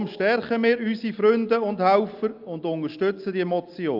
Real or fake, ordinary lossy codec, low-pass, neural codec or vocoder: real; Opus, 24 kbps; 5.4 kHz; none